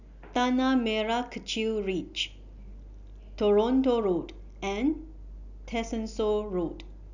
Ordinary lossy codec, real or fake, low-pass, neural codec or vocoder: none; real; 7.2 kHz; none